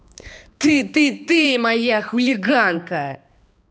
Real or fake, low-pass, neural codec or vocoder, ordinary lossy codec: fake; none; codec, 16 kHz, 2 kbps, X-Codec, HuBERT features, trained on balanced general audio; none